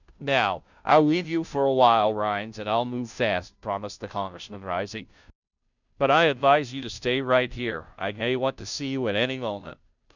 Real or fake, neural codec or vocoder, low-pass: fake; codec, 16 kHz, 0.5 kbps, FunCodec, trained on Chinese and English, 25 frames a second; 7.2 kHz